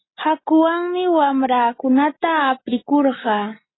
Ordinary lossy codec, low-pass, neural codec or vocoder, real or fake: AAC, 16 kbps; 7.2 kHz; none; real